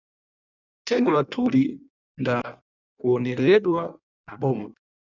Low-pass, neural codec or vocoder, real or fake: 7.2 kHz; codec, 16 kHz in and 24 kHz out, 1.1 kbps, FireRedTTS-2 codec; fake